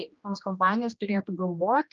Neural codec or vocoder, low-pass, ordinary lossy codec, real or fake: codec, 16 kHz, 1 kbps, X-Codec, HuBERT features, trained on general audio; 7.2 kHz; Opus, 24 kbps; fake